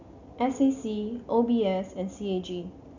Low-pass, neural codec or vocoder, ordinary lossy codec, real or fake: 7.2 kHz; none; none; real